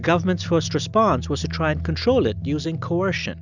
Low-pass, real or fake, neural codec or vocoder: 7.2 kHz; real; none